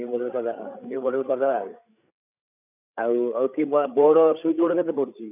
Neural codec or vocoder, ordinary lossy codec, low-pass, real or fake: codec, 16 kHz, 8 kbps, FreqCodec, larger model; none; 3.6 kHz; fake